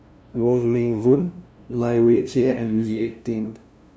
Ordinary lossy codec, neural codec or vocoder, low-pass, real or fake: none; codec, 16 kHz, 0.5 kbps, FunCodec, trained on LibriTTS, 25 frames a second; none; fake